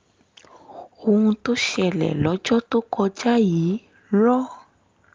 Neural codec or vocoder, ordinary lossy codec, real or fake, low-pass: none; Opus, 32 kbps; real; 7.2 kHz